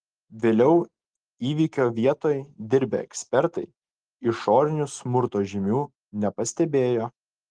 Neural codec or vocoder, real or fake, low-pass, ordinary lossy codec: none; real; 9.9 kHz; Opus, 24 kbps